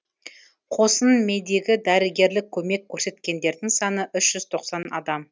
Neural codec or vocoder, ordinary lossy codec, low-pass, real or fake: none; none; none; real